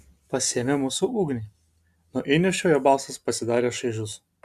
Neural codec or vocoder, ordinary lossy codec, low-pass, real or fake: none; AAC, 96 kbps; 14.4 kHz; real